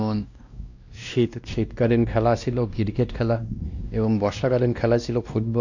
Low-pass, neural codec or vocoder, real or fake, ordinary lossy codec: 7.2 kHz; codec, 16 kHz, 1 kbps, X-Codec, WavLM features, trained on Multilingual LibriSpeech; fake; none